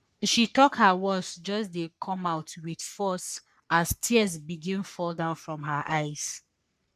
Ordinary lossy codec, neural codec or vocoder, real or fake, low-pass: none; codec, 44.1 kHz, 3.4 kbps, Pupu-Codec; fake; 14.4 kHz